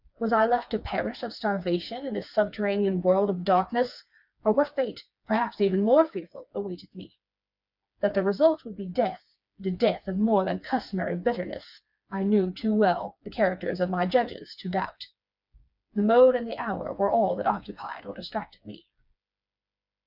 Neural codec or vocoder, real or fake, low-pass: codec, 16 kHz, 4 kbps, FreqCodec, smaller model; fake; 5.4 kHz